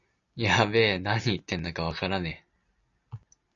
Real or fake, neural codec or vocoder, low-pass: real; none; 7.2 kHz